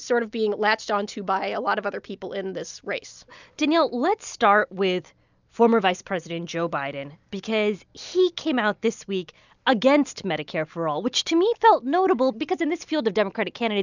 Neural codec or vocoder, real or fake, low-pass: none; real; 7.2 kHz